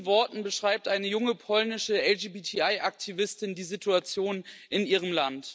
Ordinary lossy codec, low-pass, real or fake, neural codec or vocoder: none; none; real; none